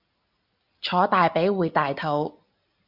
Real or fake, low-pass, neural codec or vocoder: real; 5.4 kHz; none